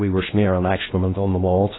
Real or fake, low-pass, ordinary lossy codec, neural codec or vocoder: fake; 7.2 kHz; AAC, 16 kbps; codec, 16 kHz in and 24 kHz out, 0.6 kbps, FocalCodec, streaming, 2048 codes